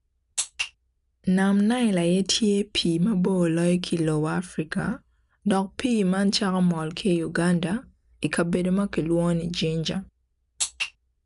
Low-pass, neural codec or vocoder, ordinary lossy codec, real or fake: 10.8 kHz; none; none; real